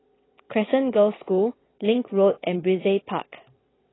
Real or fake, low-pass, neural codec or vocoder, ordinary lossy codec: fake; 7.2 kHz; vocoder, 22.05 kHz, 80 mel bands, WaveNeXt; AAC, 16 kbps